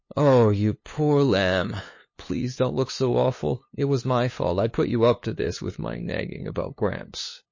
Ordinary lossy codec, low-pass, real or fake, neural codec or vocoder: MP3, 32 kbps; 7.2 kHz; fake; codec, 16 kHz, 2 kbps, FunCodec, trained on LibriTTS, 25 frames a second